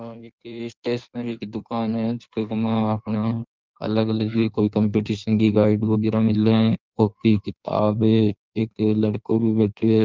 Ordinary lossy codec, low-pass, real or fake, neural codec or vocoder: Opus, 24 kbps; 7.2 kHz; fake; codec, 16 kHz in and 24 kHz out, 1.1 kbps, FireRedTTS-2 codec